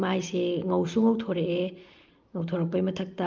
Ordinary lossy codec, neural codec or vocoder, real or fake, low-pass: Opus, 32 kbps; none; real; 7.2 kHz